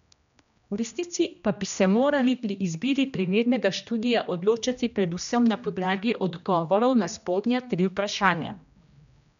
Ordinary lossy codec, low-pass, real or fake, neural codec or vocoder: none; 7.2 kHz; fake; codec, 16 kHz, 1 kbps, X-Codec, HuBERT features, trained on general audio